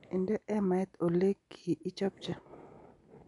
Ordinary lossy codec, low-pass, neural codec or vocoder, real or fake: none; 10.8 kHz; none; real